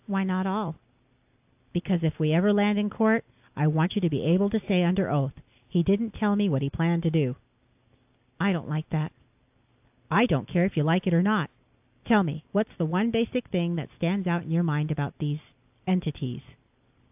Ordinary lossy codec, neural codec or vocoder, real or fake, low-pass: AAC, 32 kbps; none; real; 3.6 kHz